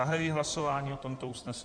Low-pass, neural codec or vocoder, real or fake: 9.9 kHz; codec, 16 kHz in and 24 kHz out, 2.2 kbps, FireRedTTS-2 codec; fake